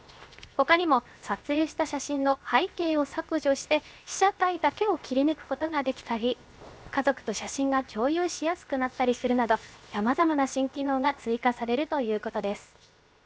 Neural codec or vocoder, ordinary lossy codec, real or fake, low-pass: codec, 16 kHz, 0.7 kbps, FocalCodec; none; fake; none